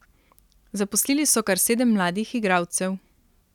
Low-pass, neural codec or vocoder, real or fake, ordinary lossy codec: 19.8 kHz; none; real; none